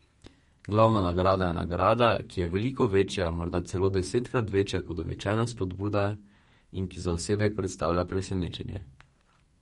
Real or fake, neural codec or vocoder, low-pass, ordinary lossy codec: fake; codec, 32 kHz, 1.9 kbps, SNAC; 14.4 kHz; MP3, 48 kbps